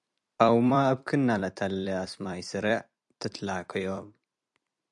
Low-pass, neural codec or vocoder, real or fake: 10.8 kHz; vocoder, 44.1 kHz, 128 mel bands every 256 samples, BigVGAN v2; fake